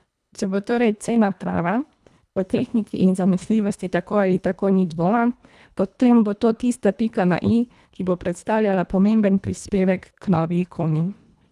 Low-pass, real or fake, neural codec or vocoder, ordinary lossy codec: none; fake; codec, 24 kHz, 1.5 kbps, HILCodec; none